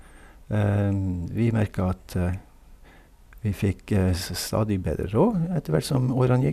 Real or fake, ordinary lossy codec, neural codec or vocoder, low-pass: real; none; none; 14.4 kHz